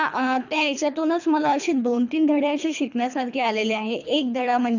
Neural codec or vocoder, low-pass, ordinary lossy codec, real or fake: codec, 24 kHz, 3 kbps, HILCodec; 7.2 kHz; none; fake